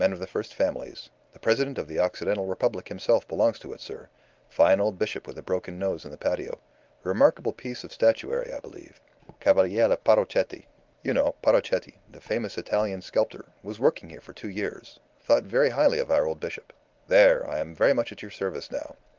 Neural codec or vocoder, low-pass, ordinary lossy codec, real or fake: none; 7.2 kHz; Opus, 32 kbps; real